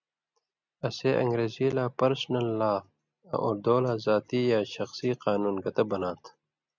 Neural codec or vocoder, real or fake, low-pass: none; real; 7.2 kHz